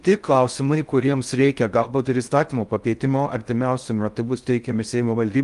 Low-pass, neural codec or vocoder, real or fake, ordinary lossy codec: 10.8 kHz; codec, 16 kHz in and 24 kHz out, 0.6 kbps, FocalCodec, streaming, 4096 codes; fake; Opus, 32 kbps